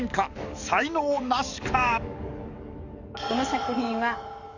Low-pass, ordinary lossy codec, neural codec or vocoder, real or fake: 7.2 kHz; none; codec, 44.1 kHz, 7.8 kbps, DAC; fake